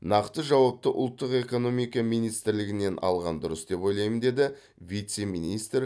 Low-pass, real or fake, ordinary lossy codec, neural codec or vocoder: none; real; none; none